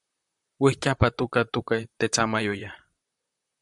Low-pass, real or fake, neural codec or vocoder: 10.8 kHz; fake; vocoder, 44.1 kHz, 128 mel bands, Pupu-Vocoder